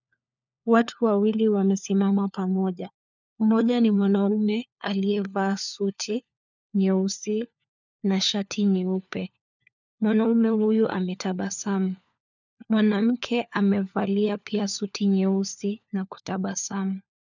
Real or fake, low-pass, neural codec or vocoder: fake; 7.2 kHz; codec, 16 kHz, 4 kbps, FunCodec, trained on LibriTTS, 50 frames a second